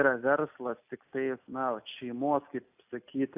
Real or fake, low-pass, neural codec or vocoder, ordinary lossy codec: real; 3.6 kHz; none; AAC, 32 kbps